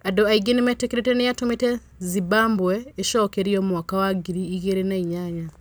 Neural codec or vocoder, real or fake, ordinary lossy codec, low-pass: none; real; none; none